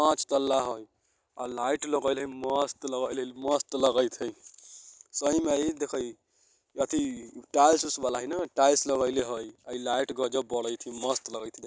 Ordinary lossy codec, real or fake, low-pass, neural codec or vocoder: none; real; none; none